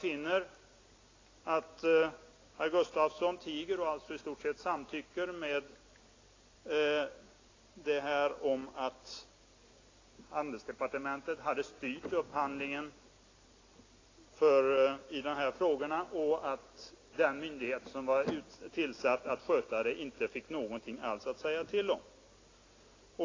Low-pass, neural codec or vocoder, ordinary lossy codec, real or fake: 7.2 kHz; none; AAC, 32 kbps; real